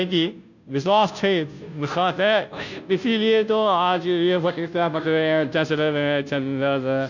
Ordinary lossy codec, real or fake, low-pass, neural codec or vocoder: none; fake; 7.2 kHz; codec, 16 kHz, 0.5 kbps, FunCodec, trained on Chinese and English, 25 frames a second